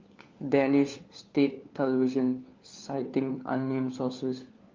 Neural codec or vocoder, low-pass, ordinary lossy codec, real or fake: codec, 16 kHz, 4 kbps, FunCodec, trained on LibriTTS, 50 frames a second; 7.2 kHz; Opus, 32 kbps; fake